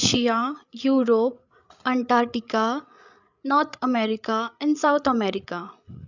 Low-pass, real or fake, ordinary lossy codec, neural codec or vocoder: 7.2 kHz; fake; none; codec, 16 kHz, 8 kbps, FreqCodec, larger model